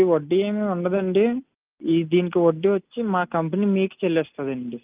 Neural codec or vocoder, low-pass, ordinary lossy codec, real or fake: none; 3.6 kHz; Opus, 24 kbps; real